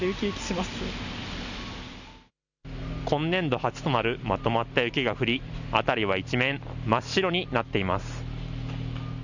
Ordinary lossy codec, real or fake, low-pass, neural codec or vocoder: none; real; 7.2 kHz; none